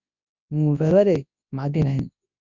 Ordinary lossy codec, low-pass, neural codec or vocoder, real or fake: Opus, 64 kbps; 7.2 kHz; codec, 24 kHz, 0.9 kbps, WavTokenizer, large speech release; fake